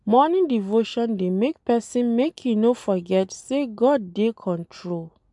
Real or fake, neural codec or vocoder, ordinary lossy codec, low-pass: real; none; MP3, 96 kbps; 10.8 kHz